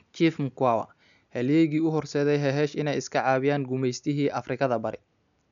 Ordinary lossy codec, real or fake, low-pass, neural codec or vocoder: none; real; 7.2 kHz; none